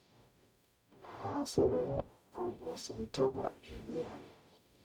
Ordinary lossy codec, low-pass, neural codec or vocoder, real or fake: none; 19.8 kHz; codec, 44.1 kHz, 0.9 kbps, DAC; fake